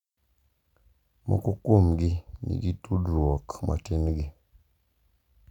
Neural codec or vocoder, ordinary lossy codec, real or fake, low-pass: none; none; real; 19.8 kHz